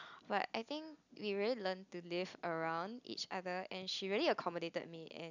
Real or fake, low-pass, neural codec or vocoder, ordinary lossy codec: real; 7.2 kHz; none; none